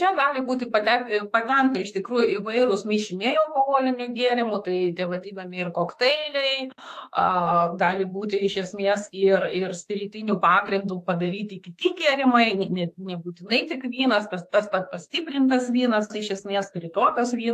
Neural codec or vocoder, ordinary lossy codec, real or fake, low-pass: autoencoder, 48 kHz, 32 numbers a frame, DAC-VAE, trained on Japanese speech; AAC, 64 kbps; fake; 14.4 kHz